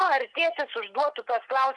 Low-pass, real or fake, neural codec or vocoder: 10.8 kHz; real; none